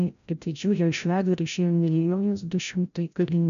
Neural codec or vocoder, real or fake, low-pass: codec, 16 kHz, 0.5 kbps, FreqCodec, larger model; fake; 7.2 kHz